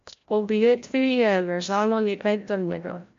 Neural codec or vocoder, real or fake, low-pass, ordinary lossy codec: codec, 16 kHz, 0.5 kbps, FreqCodec, larger model; fake; 7.2 kHz; MP3, 48 kbps